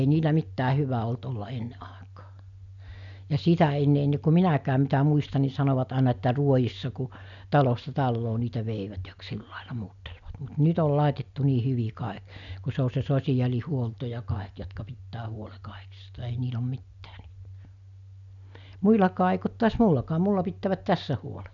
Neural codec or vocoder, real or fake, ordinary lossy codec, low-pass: none; real; none; 7.2 kHz